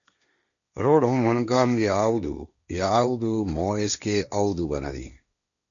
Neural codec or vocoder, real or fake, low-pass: codec, 16 kHz, 1.1 kbps, Voila-Tokenizer; fake; 7.2 kHz